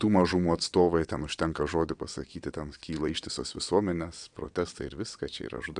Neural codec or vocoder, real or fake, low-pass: vocoder, 22.05 kHz, 80 mel bands, WaveNeXt; fake; 9.9 kHz